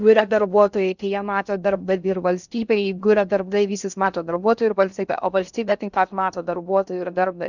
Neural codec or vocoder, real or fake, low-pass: codec, 16 kHz in and 24 kHz out, 0.6 kbps, FocalCodec, streaming, 2048 codes; fake; 7.2 kHz